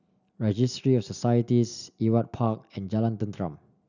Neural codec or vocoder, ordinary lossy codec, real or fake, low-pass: none; none; real; 7.2 kHz